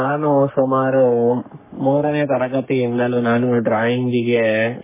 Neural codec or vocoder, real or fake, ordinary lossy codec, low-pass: codec, 44.1 kHz, 3.4 kbps, Pupu-Codec; fake; MP3, 16 kbps; 3.6 kHz